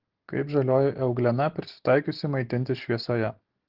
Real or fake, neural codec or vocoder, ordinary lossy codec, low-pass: real; none; Opus, 16 kbps; 5.4 kHz